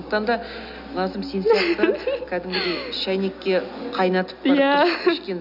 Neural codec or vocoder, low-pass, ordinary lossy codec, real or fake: none; 5.4 kHz; none; real